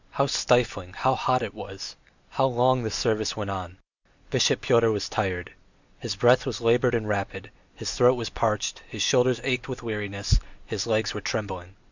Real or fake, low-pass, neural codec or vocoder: real; 7.2 kHz; none